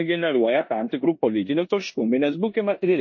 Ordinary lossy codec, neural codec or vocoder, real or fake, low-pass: MP3, 32 kbps; codec, 16 kHz in and 24 kHz out, 0.9 kbps, LongCat-Audio-Codec, four codebook decoder; fake; 7.2 kHz